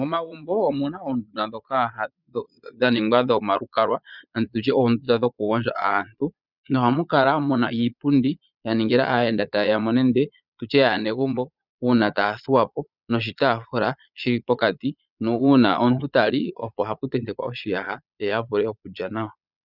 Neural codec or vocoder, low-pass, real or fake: vocoder, 22.05 kHz, 80 mel bands, Vocos; 5.4 kHz; fake